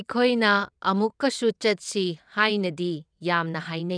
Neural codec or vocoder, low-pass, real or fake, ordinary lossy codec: vocoder, 22.05 kHz, 80 mel bands, WaveNeXt; 9.9 kHz; fake; none